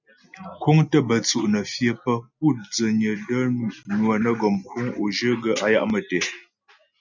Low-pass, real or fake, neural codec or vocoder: 7.2 kHz; real; none